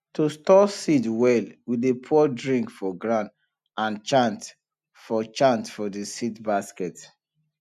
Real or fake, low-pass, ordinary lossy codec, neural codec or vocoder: real; 14.4 kHz; none; none